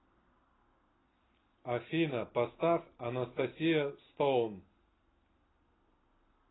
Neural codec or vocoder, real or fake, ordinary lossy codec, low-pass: none; real; AAC, 16 kbps; 7.2 kHz